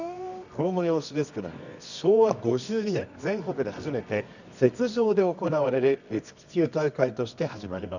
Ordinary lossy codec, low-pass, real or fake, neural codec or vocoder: none; 7.2 kHz; fake; codec, 24 kHz, 0.9 kbps, WavTokenizer, medium music audio release